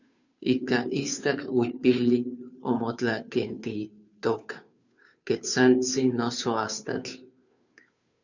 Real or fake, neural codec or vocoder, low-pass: fake; codec, 16 kHz, 2 kbps, FunCodec, trained on Chinese and English, 25 frames a second; 7.2 kHz